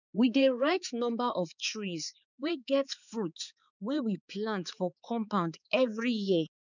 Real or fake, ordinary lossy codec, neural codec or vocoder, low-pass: fake; none; codec, 16 kHz, 4 kbps, X-Codec, HuBERT features, trained on balanced general audio; 7.2 kHz